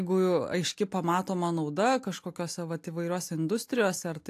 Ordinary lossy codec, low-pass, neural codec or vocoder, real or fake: AAC, 64 kbps; 14.4 kHz; none; real